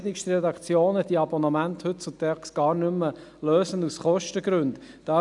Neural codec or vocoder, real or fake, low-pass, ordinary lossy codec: none; real; 10.8 kHz; none